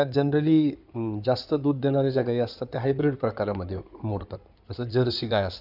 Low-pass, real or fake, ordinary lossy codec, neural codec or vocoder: 5.4 kHz; fake; none; codec, 16 kHz in and 24 kHz out, 2.2 kbps, FireRedTTS-2 codec